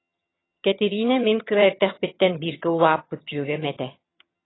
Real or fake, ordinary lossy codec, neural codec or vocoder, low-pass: fake; AAC, 16 kbps; vocoder, 22.05 kHz, 80 mel bands, HiFi-GAN; 7.2 kHz